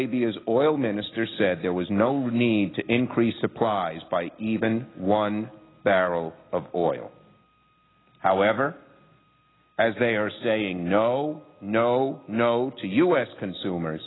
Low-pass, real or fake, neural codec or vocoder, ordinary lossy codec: 7.2 kHz; real; none; AAC, 16 kbps